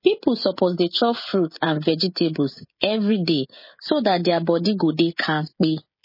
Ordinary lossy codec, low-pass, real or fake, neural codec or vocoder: MP3, 24 kbps; 5.4 kHz; fake; codec, 16 kHz, 16 kbps, FreqCodec, smaller model